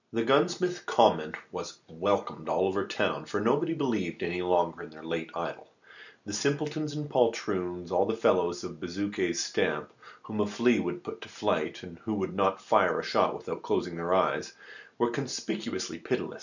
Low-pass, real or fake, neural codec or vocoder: 7.2 kHz; real; none